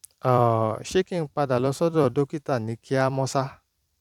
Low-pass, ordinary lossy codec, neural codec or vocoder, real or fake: 19.8 kHz; none; vocoder, 44.1 kHz, 128 mel bands every 256 samples, BigVGAN v2; fake